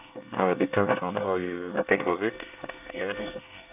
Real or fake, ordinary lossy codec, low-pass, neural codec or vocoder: fake; none; 3.6 kHz; codec, 24 kHz, 1 kbps, SNAC